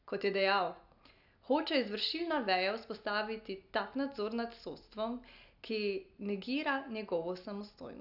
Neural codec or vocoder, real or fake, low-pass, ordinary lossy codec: none; real; 5.4 kHz; none